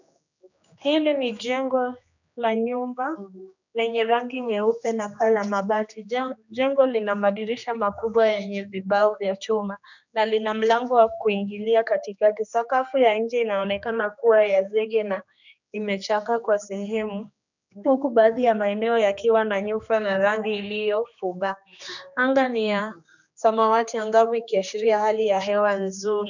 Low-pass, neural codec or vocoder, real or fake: 7.2 kHz; codec, 16 kHz, 2 kbps, X-Codec, HuBERT features, trained on general audio; fake